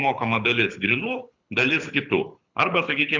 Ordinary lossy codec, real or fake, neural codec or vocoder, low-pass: Opus, 64 kbps; fake; codec, 24 kHz, 6 kbps, HILCodec; 7.2 kHz